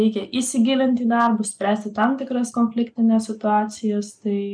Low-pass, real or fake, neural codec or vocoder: 9.9 kHz; real; none